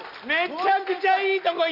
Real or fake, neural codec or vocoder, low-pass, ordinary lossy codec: real; none; 5.4 kHz; none